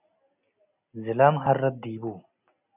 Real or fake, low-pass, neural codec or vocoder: real; 3.6 kHz; none